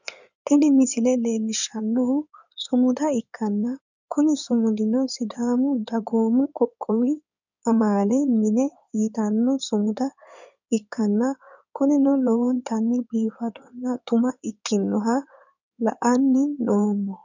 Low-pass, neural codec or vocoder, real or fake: 7.2 kHz; codec, 16 kHz in and 24 kHz out, 2.2 kbps, FireRedTTS-2 codec; fake